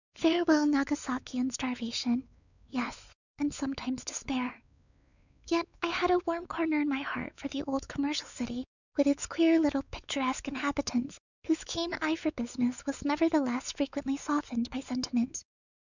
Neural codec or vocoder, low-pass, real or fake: codec, 16 kHz, 4 kbps, X-Codec, WavLM features, trained on Multilingual LibriSpeech; 7.2 kHz; fake